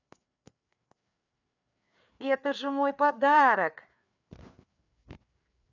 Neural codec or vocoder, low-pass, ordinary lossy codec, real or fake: codec, 16 kHz, 4 kbps, FreqCodec, larger model; 7.2 kHz; none; fake